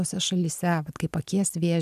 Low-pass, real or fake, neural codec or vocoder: 14.4 kHz; real; none